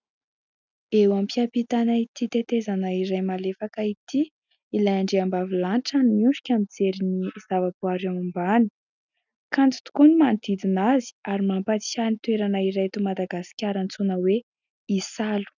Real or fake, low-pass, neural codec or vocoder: real; 7.2 kHz; none